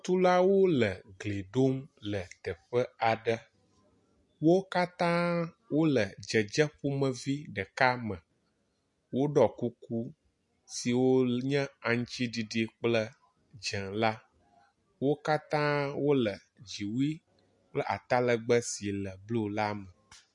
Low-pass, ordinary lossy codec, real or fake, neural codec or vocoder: 10.8 kHz; MP3, 48 kbps; real; none